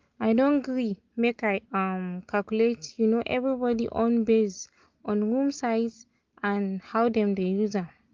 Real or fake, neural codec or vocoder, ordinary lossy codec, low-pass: real; none; Opus, 32 kbps; 7.2 kHz